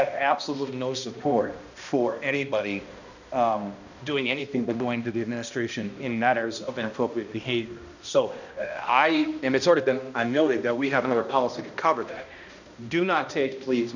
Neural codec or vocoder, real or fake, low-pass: codec, 16 kHz, 1 kbps, X-Codec, HuBERT features, trained on balanced general audio; fake; 7.2 kHz